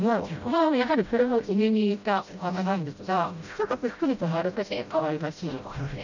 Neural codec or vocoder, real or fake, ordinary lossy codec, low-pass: codec, 16 kHz, 0.5 kbps, FreqCodec, smaller model; fake; none; 7.2 kHz